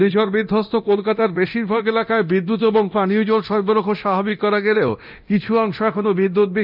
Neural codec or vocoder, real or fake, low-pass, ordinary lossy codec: codec, 24 kHz, 0.9 kbps, DualCodec; fake; 5.4 kHz; none